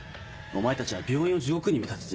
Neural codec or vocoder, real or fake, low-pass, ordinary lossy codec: none; real; none; none